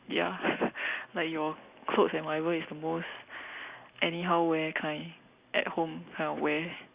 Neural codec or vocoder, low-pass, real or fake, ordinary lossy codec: none; 3.6 kHz; real; Opus, 64 kbps